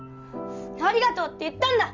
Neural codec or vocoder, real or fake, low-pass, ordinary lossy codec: none; real; 7.2 kHz; Opus, 32 kbps